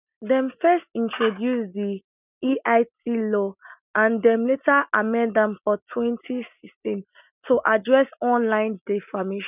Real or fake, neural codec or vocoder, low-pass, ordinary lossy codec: real; none; 3.6 kHz; none